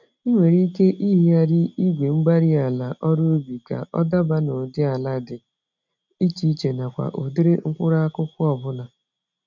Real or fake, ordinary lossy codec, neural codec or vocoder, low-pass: real; none; none; 7.2 kHz